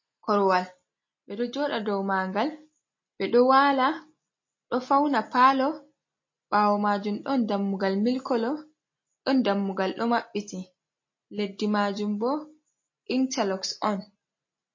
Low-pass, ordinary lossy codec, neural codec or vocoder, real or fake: 7.2 kHz; MP3, 32 kbps; none; real